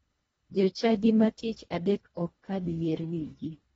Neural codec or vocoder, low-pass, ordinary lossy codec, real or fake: codec, 24 kHz, 1.5 kbps, HILCodec; 10.8 kHz; AAC, 24 kbps; fake